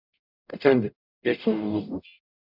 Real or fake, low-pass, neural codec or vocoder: fake; 5.4 kHz; codec, 44.1 kHz, 0.9 kbps, DAC